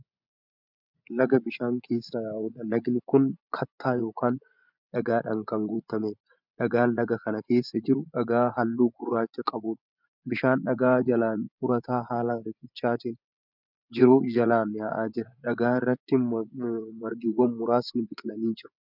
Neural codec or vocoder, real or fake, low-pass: vocoder, 24 kHz, 100 mel bands, Vocos; fake; 5.4 kHz